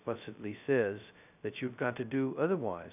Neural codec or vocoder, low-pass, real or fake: codec, 16 kHz, 0.2 kbps, FocalCodec; 3.6 kHz; fake